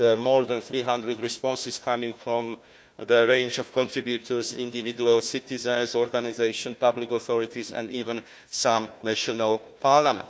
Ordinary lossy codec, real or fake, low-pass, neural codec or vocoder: none; fake; none; codec, 16 kHz, 1 kbps, FunCodec, trained on Chinese and English, 50 frames a second